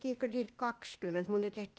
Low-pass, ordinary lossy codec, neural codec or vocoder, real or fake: none; none; codec, 16 kHz, 0.8 kbps, ZipCodec; fake